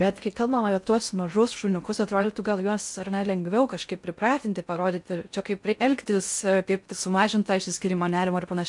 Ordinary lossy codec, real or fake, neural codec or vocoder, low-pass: MP3, 64 kbps; fake; codec, 16 kHz in and 24 kHz out, 0.6 kbps, FocalCodec, streaming, 4096 codes; 10.8 kHz